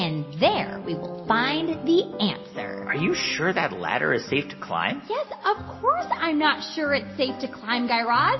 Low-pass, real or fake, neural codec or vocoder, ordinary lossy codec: 7.2 kHz; real; none; MP3, 24 kbps